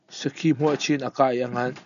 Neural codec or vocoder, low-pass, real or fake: none; 7.2 kHz; real